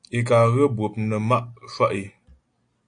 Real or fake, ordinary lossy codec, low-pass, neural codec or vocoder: real; AAC, 64 kbps; 9.9 kHz; none